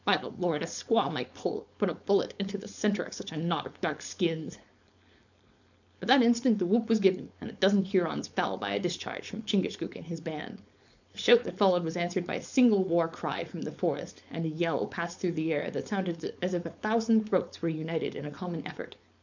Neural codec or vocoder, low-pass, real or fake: codec, 16 kHz, 4.8 kbps, FACodec; 7.2 kHz; fake